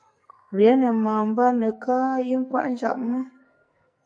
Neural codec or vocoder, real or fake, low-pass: codec, 44.1 kHz, 2.6 kbps, SNAC; fake; 9.9 kHz